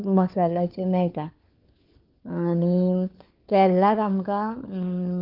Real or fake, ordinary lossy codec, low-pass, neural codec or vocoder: fake; Opus, 24 kbps; 5.4 kHz; codec, 16 kHz, 4 kbps, FunCodec, trained on LibriTTS, 50 frames a second